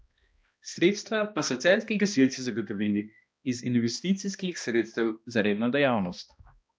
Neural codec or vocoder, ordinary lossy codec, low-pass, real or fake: codec, 16 kHz, 1 kbps, X-Codec, HuBERT features, trained on balanced general audio; none; none; fake